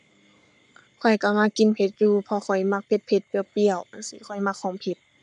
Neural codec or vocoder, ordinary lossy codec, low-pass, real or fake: codec, 44.1 kHz, 7.8 kbps, Pupu-Codec; none; 10.8 kHz; fake